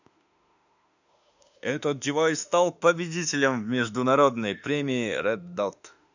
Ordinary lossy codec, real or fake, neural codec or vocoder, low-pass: none; fake; autoencoder, 48 kHz, 32 numbers a frame, DAC-VAE, trained on Japanese speech; 7.2 kHz